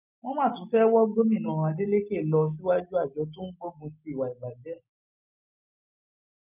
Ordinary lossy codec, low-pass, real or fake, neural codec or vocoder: none; 3.6 kHz; real; none